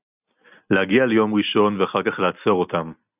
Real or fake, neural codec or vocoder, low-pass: real; none; 3.6 kHz